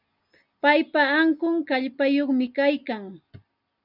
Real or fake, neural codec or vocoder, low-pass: real; none; 5.4 kHz